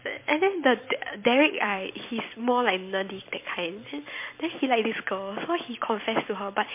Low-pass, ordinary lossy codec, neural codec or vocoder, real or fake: 3.6 kHz; MP3, 24 kbps; vocoder, 44.1 kHz, 128 mel bands every 256 samples, BigVGAN v2; fake